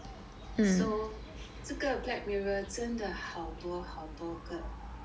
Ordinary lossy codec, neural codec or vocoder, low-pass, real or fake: none; none; none; real